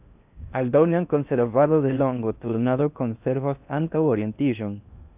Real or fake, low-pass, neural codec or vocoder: fake; 3.6 kHz; codec, 16 kHz in and 24 kHz out, 0.6 kbps, FocalCodec, streaming, 4096 codes